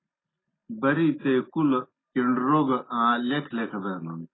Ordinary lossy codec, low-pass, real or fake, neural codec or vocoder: AAC, 16 kbps; 7.2 kHz; real; none